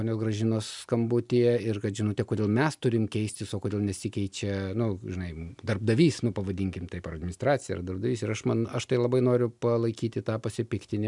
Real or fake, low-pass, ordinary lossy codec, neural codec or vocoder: real; 10.8 kHz; MP3, 96 kbps; none